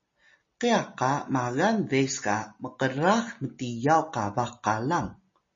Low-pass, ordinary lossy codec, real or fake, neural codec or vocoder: 7.2 kHz; MP3, 32 kbps; real; none